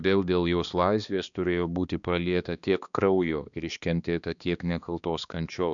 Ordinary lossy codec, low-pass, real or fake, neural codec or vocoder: MP3, 96 kbps; 7.2 kHz; fake; codec, 16 kHz, 2 kbps, X-Codec, HuBERT features, trained on balanced general audio